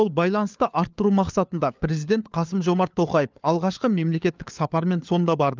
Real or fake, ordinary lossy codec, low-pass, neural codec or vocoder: fake; Opus, 24 kbps; 7.2 kHz; codec, 16 kHz, 4 kbps, FunCodec, trained on LibriTTS, 50 frames a second